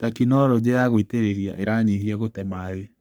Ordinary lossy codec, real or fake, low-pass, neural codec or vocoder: none; fake; none; codec, 44.1 kHz, 3.4 kbps, Pupu-Codec